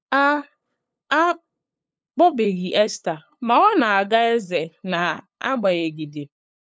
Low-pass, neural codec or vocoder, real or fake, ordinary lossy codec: none; codec, 16 kHz, 8 kbps, FunCodec, trained on LibriTTS, 25 frames a second; fake; none